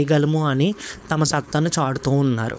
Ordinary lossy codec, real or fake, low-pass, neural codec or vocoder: none; fake; none; codec, 16 kHz, 4.8 kbps, FACodec